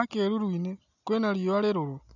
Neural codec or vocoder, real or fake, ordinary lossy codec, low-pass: none; real; none; 7.2 kHz